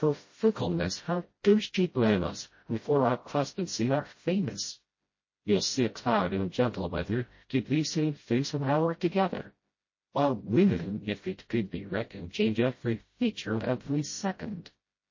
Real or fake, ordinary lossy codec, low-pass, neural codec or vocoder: fake; MP3, 32 kbps; 7.2 kHz; codec, 16 kHz, 0.5 kbps, FreqCodec, smaller model